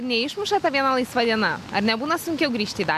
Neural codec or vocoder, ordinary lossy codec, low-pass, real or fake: none; AAC, 96 kbps; 14.4 kHz; real